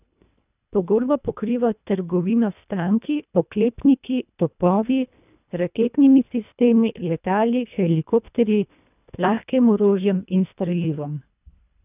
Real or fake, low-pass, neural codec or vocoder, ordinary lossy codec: fake; 3.6 kHz; codec, 24 kHz, 1.5 kbps, HILCodec; none